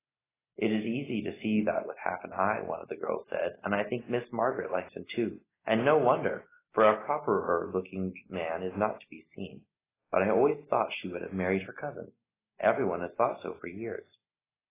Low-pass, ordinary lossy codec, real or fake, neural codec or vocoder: 3.6 kHz; AAC, 16 kbps; real; none